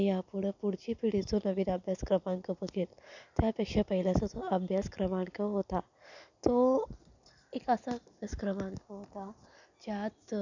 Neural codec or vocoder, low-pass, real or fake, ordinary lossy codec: none; 7.2 kHz; real; none